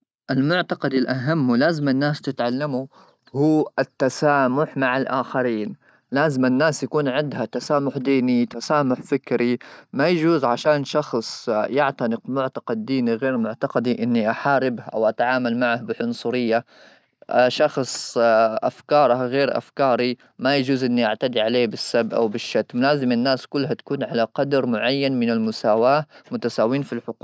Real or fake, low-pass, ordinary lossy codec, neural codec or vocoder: real; none; none; none